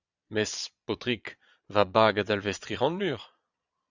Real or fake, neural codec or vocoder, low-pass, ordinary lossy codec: fake; vocoder, 22.05 kHz, 80 mel bands, Vocos; 7.2 kHz; Opus, 64 kbps